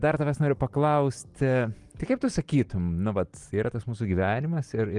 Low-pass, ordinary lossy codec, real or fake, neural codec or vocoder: 10.8 kHz; Opus, 24 kbps; real; none